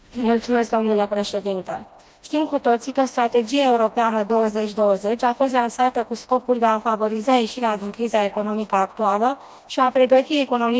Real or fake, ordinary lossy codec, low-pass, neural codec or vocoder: fake; none; none; codec, 16 kHz, 1 kbps, FreqCodec, smaller model